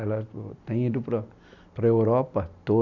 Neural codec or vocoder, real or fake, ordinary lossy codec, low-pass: none; real; none; 7.2 kHz